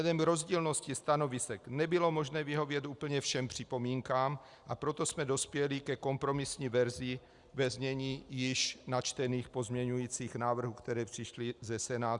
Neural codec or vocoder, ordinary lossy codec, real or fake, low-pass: none; Opus, 64 kbps; real; 10.8 kHz